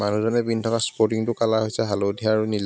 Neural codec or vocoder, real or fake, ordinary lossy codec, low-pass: none; real; none; none